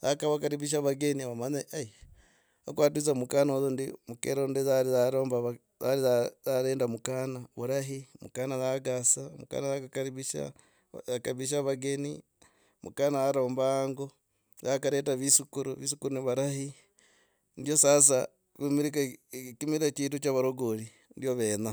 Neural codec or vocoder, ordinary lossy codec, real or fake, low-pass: none; none; real; none